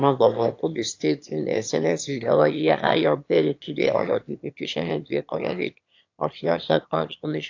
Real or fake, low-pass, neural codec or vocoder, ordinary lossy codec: fake; 7.2 kHz; autoencoder, 22.05 kHz, a latent of 192 numbers a frame, VITS, trained on one speaker; AAC, 48 kbps